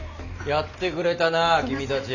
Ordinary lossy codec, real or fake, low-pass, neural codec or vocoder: none; real; 7.2 kHz; none